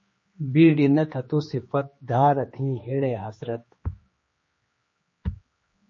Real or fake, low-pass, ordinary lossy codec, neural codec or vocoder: fake; 7.2 kHz; MP3, 32 kbps; codec, 16 kHz, 2 kbps, X-Codec, HuBERT features, trained on general audio